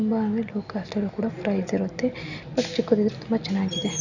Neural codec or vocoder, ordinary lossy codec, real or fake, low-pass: none; none; real; 7.2 kHz